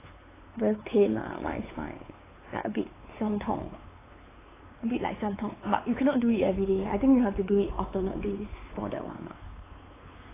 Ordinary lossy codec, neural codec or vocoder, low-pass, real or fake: AAC, 16 kbps; codec, 16 kHz, 4 kbps, X-Codec, WavLM features, trained on Multilingual LibriSpeech; 3.6 kHz; fake